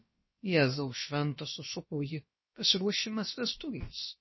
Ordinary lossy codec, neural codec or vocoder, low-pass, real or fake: MP3, 24 kbps; codec, 16 kHz, about 1 kbps, DyCAST, with the encoder's durations; 7.2 kHz; fake